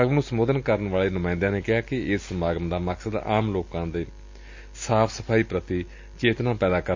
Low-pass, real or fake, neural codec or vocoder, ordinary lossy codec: 7.2 kHz; real; none; MP3, 48 kbps